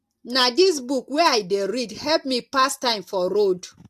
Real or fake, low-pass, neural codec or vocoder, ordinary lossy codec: real; 14.4 kHz; none; AAC, 64 kbps